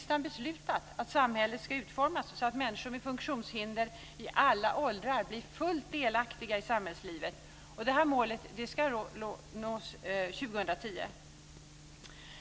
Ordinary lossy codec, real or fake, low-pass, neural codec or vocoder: none; real; none; none